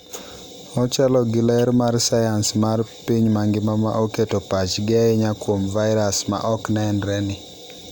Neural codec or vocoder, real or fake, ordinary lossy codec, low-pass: none; real; none; none